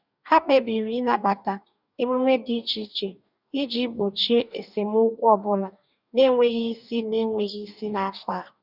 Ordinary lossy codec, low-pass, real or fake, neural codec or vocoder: none; 5.4 kHz; fake; codec, 44.1 kHz, 2.6 kbps, DAC